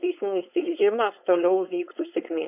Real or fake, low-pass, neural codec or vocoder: fake; 3.6 kHz; codec, 16 kHz, 4.8 kbps, FACodec